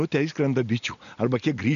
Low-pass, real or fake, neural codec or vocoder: 7.2 kHz; real; none